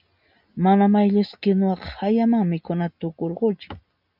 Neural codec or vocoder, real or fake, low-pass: none; real; 5.4 kHz